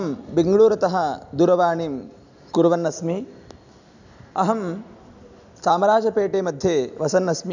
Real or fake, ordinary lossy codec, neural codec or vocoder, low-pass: real; none; none; 7.2 kHz